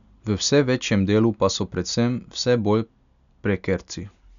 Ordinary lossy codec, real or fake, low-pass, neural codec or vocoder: none; real; 7.2 kHz; none